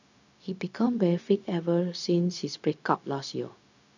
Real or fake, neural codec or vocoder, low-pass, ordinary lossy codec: fake; codec, 16 kHz, 0.4 kbps, LongCat-Audio-Codec; 7.2 kHz; none